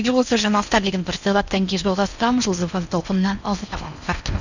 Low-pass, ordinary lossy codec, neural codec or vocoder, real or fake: 7.2 kHz; none; codec, 16 kHz in and 24 kHz out, 0.6 kbps, FocalCodec, streaming, 4096 codes; fake